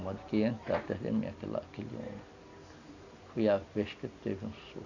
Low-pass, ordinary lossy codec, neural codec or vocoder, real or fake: 7.2 kHz; none; none; real